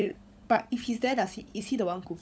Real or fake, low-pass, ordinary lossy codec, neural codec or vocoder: fake; none; none; codec, 16 kHz, 16 kbps, FunCodec, trained on LibriTTS, 50 frames a second